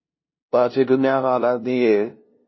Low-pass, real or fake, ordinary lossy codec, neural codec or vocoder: 7.2 kHz; fake; MP3, 24 kbps; codec, 16 kHz, 0.5 kbps, FunCodec, trained on LibriTTS, 25 frames a second